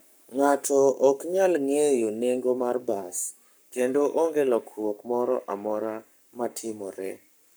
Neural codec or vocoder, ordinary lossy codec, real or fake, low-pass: codec, 44.1 kHz, 7.8 kbps, Pupu-Codec; none; fake; none